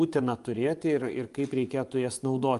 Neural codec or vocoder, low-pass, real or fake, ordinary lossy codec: vocoder, 24 kHz, 100 mel bands, Vocos; 10.8 kHz; fake; Opus, 32 kbps